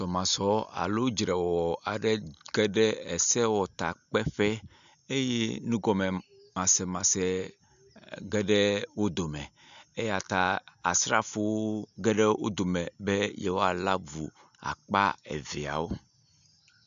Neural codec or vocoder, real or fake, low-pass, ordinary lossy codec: none; real; 7.2 kHz; AAC, 96 kbps